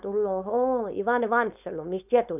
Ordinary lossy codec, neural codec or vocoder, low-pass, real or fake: none; codec, 16 kHz, 4.8 kbps, FACodec; 3.6 kHz; fake